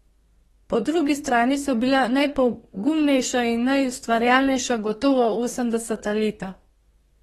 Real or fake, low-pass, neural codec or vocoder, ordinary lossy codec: fake; 14.4 kHz; codec, 32 kHz, 1.9 kbps, SNAC; AAC, 32 kbps